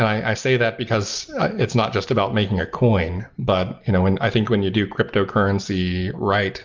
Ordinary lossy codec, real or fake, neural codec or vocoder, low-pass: Opus, 24 kbps; real; none; 7.2 kHz